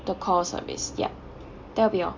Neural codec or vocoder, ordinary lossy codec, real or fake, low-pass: none; MP3, 64 kbps; real; 7.2 kHz